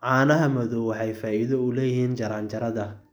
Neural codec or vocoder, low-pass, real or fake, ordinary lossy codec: none; none; real; none